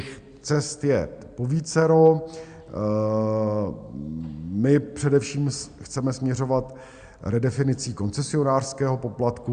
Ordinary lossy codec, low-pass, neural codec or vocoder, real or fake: Opus, 64 kbps; 9.9 kHz; none; real